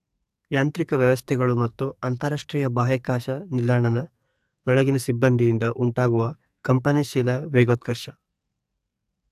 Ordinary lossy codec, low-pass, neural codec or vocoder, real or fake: AAC, 96 kbps; 14.4 kHz; codec, 44.1 kHz, 2.6 kbps, SNAC; fake